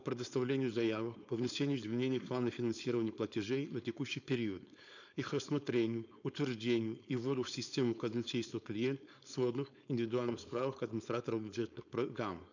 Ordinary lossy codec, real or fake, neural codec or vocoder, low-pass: none; fake; codec, 16 kHz, 4.8 kbps, FACodec; 7.2 kHz